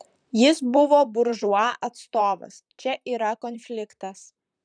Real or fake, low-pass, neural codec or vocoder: fake; 9.9 kHz; vocoder, 44.1 kHz, 128 mel bands, Pupu-Vocoder